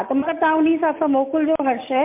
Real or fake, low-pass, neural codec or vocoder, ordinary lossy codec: real; 3.6 kHz; none; MP3, 32 kbps